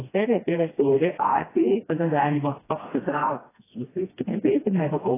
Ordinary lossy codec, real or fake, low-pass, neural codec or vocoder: AAC, 16 kbps; fake; 3.6 kHz; codec, 16 kHz, 1 kbps, FreqCodec, smaller model